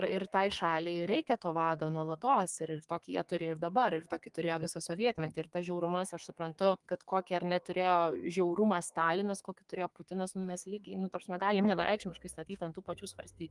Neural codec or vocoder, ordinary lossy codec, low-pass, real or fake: codec, 32 kHz, 1.9 kbps, SNAC; Opus, 32 kbps; 10.8 kHz; fake